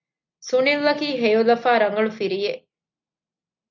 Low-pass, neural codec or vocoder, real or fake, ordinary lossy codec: 7.2 kHz; none; real; MP3, 48 kbps